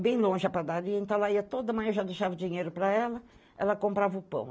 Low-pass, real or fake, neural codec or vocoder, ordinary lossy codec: none; real; none; none